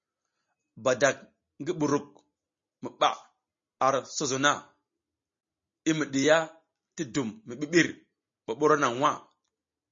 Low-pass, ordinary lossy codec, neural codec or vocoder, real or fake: 7.2 kHz; MP3, 32 kbps; none; real